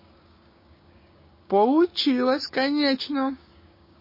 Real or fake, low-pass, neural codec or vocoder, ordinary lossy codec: fake; 5.4 kHz; codec, 16 kHz, 6 kbps, DAC; MP3, 24 kbps